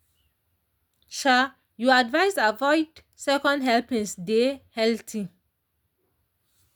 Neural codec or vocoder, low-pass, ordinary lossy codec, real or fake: none; none; none; real